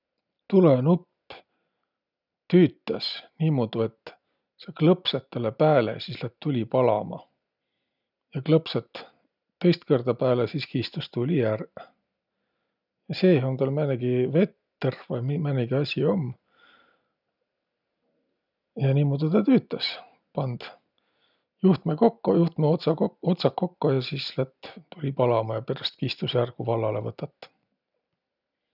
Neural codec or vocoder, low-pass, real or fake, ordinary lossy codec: none; 5.4 kHz; real; none